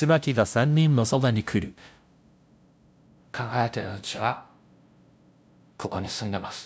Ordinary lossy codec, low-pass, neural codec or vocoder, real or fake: none; none; codec, 16 kHz, 0.5 kbps, FunCodec, trained on LibriTTS, 25 frames a second; fake